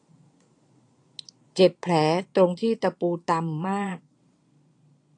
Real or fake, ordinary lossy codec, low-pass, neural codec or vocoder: fake; AAC, 48 kbps; 9.9 kHz; vocoder, 22.05 kHz, 80 mel bands, Vocos